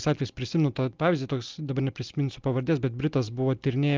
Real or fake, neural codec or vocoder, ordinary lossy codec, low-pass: real; none; Opus, 24 kbps; 7.2 kHz